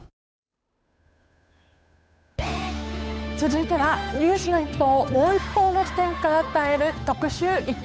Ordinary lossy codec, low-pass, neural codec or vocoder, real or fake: none; none; codec, 16 kHz, 8 kbps, FunCodec, trained on Chinese and English, 25 frames a second; fake